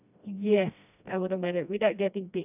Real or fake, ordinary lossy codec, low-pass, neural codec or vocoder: fake; none; 3.6 kHz; codec, 16 kHz, 1 kbps, FreqCodec, smaller model